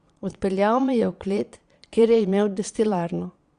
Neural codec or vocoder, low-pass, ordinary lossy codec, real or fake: vocoder, 22.05 kHz, 80 mel bands, Vocos; 9.9 kHz; Opus, 64 kbps; fake